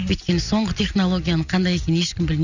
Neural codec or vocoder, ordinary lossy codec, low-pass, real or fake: none; none; 7.2 kHz; real